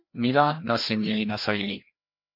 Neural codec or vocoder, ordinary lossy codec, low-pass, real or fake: codec, 16 kHz, 1 kbps, FreqCodec, larger model; MP3, 32 kbps; 5.4 kHz; fake